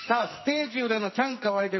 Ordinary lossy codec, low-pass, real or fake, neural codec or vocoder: MP3, 24 kbps; 7.2 kHz; fake; codec, 44.1 kHz, 2.6 kbps, SNAC